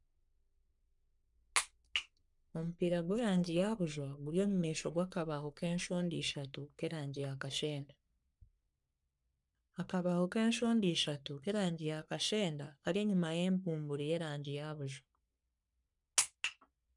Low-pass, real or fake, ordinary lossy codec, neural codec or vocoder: 10.8 kHz; fake; none; codec, 44.1 kHz, 3.4 kbps, Pupu-Codec